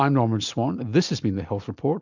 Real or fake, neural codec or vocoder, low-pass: real; none; 7.2 kHz